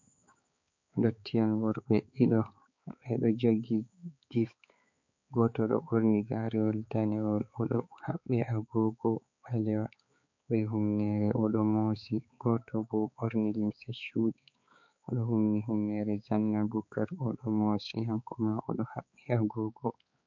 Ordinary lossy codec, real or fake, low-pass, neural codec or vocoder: MP3, 48 kbps; fake; 7.2 kHz; codec, 16 kHz, 4 kbps, X-Codec, HuBERT features, trained on balanced general audio